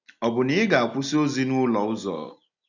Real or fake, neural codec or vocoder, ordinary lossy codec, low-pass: real; none; none; 7.2 kHz